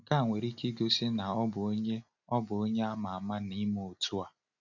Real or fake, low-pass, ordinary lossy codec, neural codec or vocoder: real; 7.2 kHz; none; none